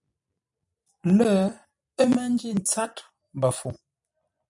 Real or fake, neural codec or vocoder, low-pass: fake; vocoder, 44.1 kHz, 128 mel bands every 256 samples, BigVGAN v2; 10.8 kHz